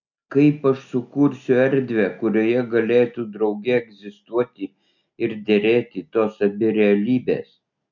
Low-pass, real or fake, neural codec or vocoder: 7.2 kHz; real; none